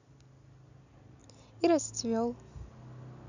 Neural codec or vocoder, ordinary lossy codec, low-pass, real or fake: none; none; 7.2 kHz; real